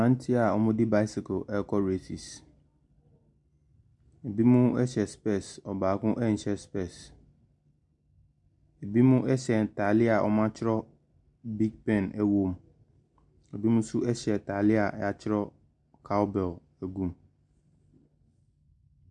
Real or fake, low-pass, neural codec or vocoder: real; 10.8 kHz; none